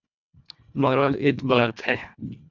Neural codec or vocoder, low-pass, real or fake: codec, 24 kHz, 1.5 kbps, HILCodec; 7.2 kHz; fake